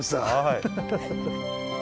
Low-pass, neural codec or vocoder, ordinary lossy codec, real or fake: none; none; none; real